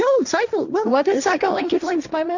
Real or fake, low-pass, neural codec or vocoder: fake; 7.2 kHz; codec, 16 kHz, 1.1 kbps, Voila-Tokenizer